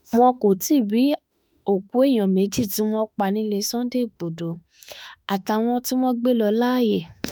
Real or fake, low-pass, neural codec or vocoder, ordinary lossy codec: fake; none; autoencoder, 48 kHz, 32 numbers a frame, DAC-VAE, trained on Japanese speech; none